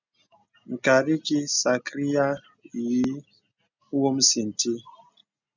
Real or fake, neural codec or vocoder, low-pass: real; none; 7.2 kHz